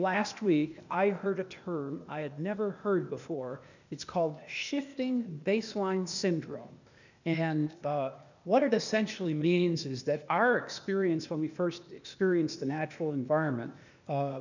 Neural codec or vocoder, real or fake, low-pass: codec, 16 kHz, 0.8 kbps, ZipCodec; fake; 7.2 kHz